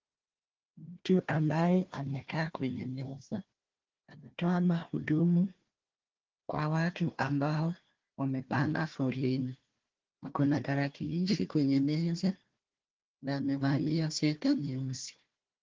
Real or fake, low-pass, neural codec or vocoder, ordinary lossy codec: fake; 7.2 kHz; codec, 16 kHz, 1 kbps, FunCodec, trained on Chinese and English, 50 frames a second; Opus, 16 kbps